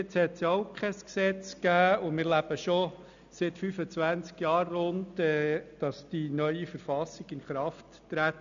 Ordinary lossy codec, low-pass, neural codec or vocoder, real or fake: none; 7.2 kHz; none; real